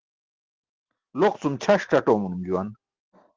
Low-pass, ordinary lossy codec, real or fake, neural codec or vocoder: 7.2 kHz; Opus, 16 kbps; real; none